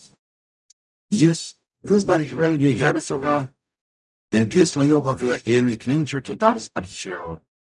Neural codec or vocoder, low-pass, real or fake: codec, 44.1 kHz, 0.9 kbps, DAC; 10.8 kHz; fake